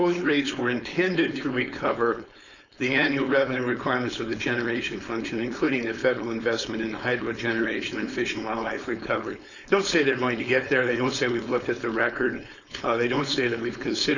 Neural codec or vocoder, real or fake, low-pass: codec, 16 kHz, 4.8 kbps, FACodec; fake; 7.2 kHz